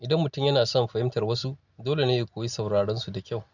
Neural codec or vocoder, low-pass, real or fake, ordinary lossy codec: none; 7.2 kHz; real; none